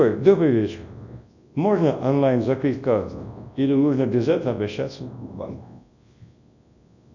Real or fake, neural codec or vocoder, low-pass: fake; codec, 24 kHz, 0.9 kbps, WavTokenizer, large speech release; 7.2 kHz